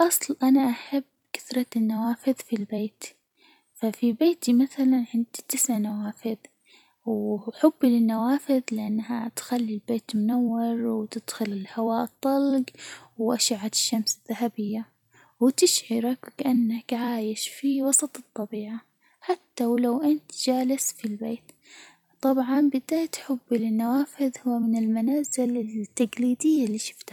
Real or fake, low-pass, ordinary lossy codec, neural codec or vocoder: fake; 19.8 kHz; none; vocoder, 44.1 kHz, 128 mel bands every 256 samples, BigVGAN v2